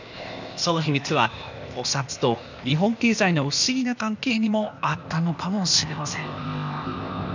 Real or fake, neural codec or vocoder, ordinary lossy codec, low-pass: fake; codec, 16 kHz, 0.8 kbps, ZipCodec; none; 7.2 kHz